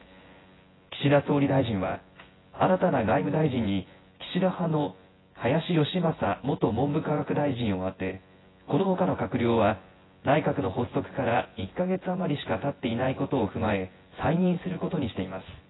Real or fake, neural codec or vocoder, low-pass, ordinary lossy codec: fake; vocoder, 24 kHz, 100 mel bands, Vocos; 7.2 kHz; AAC, 16 kbps